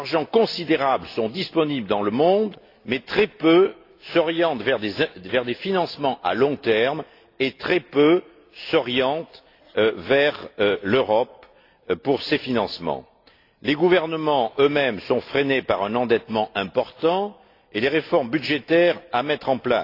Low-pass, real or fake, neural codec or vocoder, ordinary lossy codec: 5.4 kHz; real; none; AAC, 32 kbps